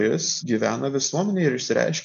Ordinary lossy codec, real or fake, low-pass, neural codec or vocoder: MP3, 96 kbps; real; 7.2 kHz; none